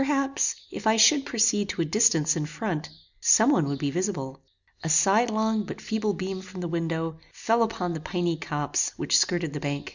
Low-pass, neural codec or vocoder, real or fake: 7.2 kHz; none; real